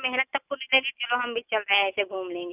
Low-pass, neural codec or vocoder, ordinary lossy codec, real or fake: 3.6 kHz; none; none; real